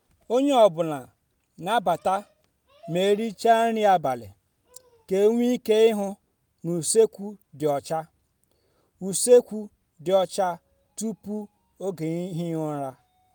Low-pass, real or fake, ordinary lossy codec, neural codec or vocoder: none; real; none; none